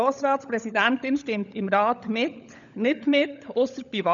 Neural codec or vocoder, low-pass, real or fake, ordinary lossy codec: codec, 16 kHz, 16 kbps, FunCodec, trained on LibriTTS, 50 frames a second; 7.2 kHz; fake; none